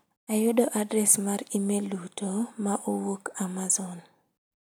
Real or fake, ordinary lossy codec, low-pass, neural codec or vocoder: real; none; none; none